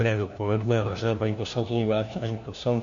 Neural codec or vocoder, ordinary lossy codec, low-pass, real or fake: codec, 16 kHz, 1 kbps, FunCodec, trained on LibriTTS, 50 frames a second; MP3, 48 kbps; 7.2 kHz; fake